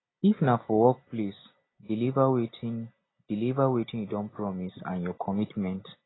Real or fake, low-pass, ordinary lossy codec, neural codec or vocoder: real; 7.2 kHz; AAC, 16 kbps; none